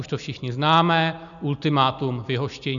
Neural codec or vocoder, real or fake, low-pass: none; real; 7.2 kHz